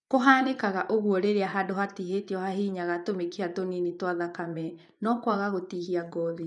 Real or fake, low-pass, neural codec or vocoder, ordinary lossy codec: fake; 10.8 kHz; vocoder, 24 kHz, 100 mel bands, Vocos; none